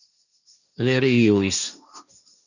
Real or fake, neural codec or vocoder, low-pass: fake; codec, 16 kHz, 1.1 kbps, Voila-Tokenizer; 7.2 kHz